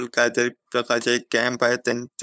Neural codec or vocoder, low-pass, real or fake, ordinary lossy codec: codec, 16 kHz, 8 kbps, FunCodec, trained on LibriTTS, 25 frames a second; none; fake; none